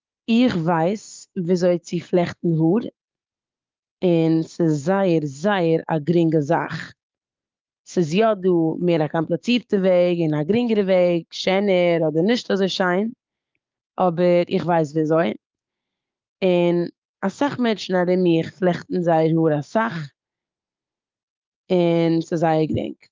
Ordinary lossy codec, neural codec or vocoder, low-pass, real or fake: Opus, 32 kbps; codec, 16 kHz, 6 kbps, DAC; 7.2 kHz; fake